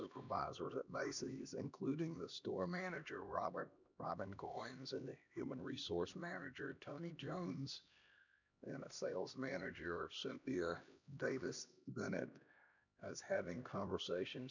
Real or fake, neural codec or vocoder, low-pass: fake; codec, 16 kHz, 1 kbps, X-Codec, HuBERT features, trained on LibriSpeech; 7.2 kHz